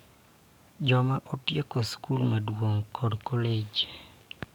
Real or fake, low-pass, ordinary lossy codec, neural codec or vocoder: fake; 19.8 kHz; none; codec, 44.1 kHz, 7.8 kbps, DAC